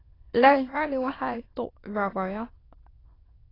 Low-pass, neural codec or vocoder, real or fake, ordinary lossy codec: 5.4 kHz; autoencoder, 22.05 kHz, a latent of 192 numbers a frame, VITS, trained on many speakers; fake; AAC, 24 kbps